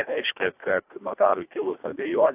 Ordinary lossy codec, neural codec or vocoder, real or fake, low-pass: AAC, 32 kbps; codec, 24 kHz, 1.5 kbps, HILCodec; fake; 3.6 kHz